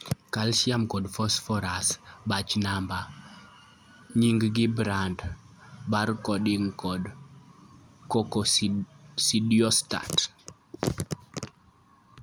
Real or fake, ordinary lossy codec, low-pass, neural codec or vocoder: real; none; none; none